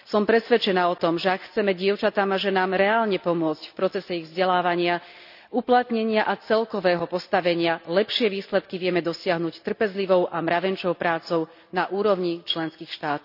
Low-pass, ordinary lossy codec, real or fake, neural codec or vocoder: 5.4 kHz; none; real; none